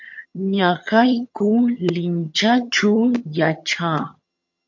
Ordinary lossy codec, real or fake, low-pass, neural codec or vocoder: MP3, 48 kbps; fake; 7.2 kHz; vocoder, 22.05 kHz, 80 mel bands, HiFi-GAN